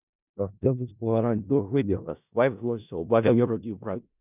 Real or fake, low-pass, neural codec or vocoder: fake; 3.6 kHz; codec, 16 kHz in and 24 kHz out, 0.4 kbps, LongCat-Audio-Codec, four codebook decoder